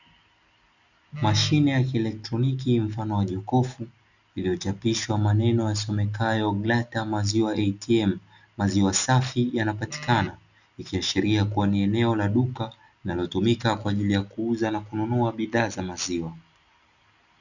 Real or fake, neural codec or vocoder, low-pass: real; none; 7.2 kHz